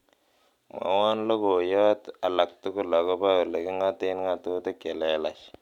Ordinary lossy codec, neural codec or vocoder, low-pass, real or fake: none; none; 19.8 kHz; real